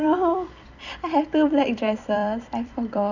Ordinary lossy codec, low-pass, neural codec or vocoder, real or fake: none; 7.2 kHz; vocoder, 44.1 kHz, 128 mel bands every 256 samples, BigVGAN v2; fake